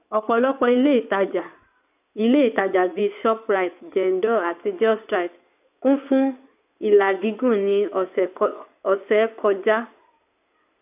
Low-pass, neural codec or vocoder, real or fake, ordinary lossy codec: 3.6 kHz; codec, 16 kHz in and 24 kHz out, 2.2 kbps, FireRedTTS-2 codec; fake; none